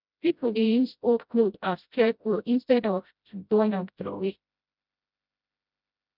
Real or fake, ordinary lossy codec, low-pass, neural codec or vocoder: fake; none; 5.4 kHz; codec, 16 kHz, 0.5 kbps, FreqCodec, smaller model